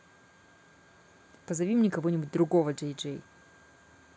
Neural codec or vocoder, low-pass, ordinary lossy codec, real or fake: none; none; none; real